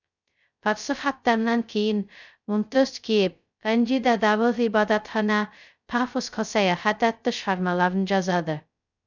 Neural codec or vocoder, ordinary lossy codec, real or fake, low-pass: codec, 16 kHz, 0.2 kbps, FocalCodec; none; fake; 7.2 kHz